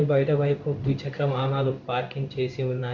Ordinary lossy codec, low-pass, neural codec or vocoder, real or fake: MP3, 64 kbps; 7.2 kHz; codec, 16 kHz in and 24 kHz out, 1 kbps, XY-Tokenizer; fake